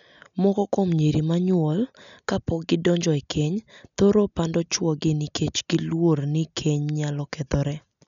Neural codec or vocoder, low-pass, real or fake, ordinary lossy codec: none; 7.2 kHz; real; none